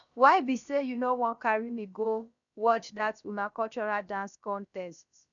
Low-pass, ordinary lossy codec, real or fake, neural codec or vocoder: 7.2 kHz; none; fake; codec, 16 kHz, about 1 kbps, DyCAST, with the encoder's durations